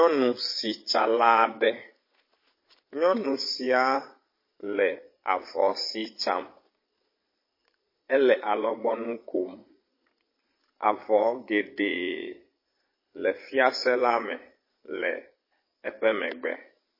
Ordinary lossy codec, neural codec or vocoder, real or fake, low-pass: MP3, 24 kbps; vocoder, 22.05 kHz, 80 mel bands, Vocos; fake; 5.4 kHz